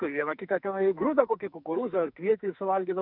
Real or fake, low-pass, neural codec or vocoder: fake; 5.4 kHz; codec, 44.1 kHz, 2.6 kbps, SNAC